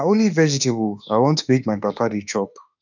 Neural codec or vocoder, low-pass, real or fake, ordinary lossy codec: autoencoder, 48 kHz, 32 numbers a frame, DAC-VAE, trained on Japanese speech; 7.2 kHz; fake; none